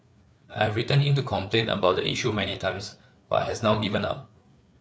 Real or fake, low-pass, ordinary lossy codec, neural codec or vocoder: fake; none; none; codec, 16 kHz, 4 kbps, FreqCodec, larger model